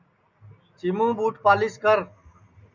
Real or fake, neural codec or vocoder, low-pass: real; none; 7.2 kHz